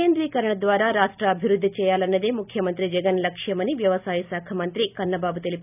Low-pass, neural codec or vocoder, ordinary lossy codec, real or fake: 3.6 kHz; none; none; real